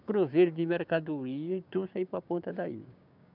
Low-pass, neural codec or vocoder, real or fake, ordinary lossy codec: 5.4 kHz; codec, 16 kHz, 6 kbps, DAC; fake; none